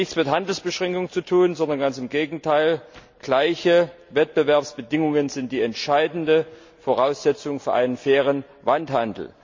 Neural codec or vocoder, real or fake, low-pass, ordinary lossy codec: none; real; 7.2 kHz; MP3, 64 kbps